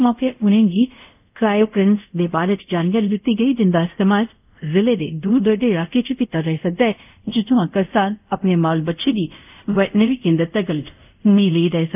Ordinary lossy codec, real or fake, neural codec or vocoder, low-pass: none; fake; codec, 24 kHz, 0.5 kbps, DualCodec; 3.6 kHz